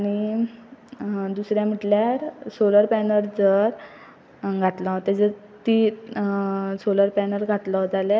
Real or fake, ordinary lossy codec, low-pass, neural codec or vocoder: real; none; none; none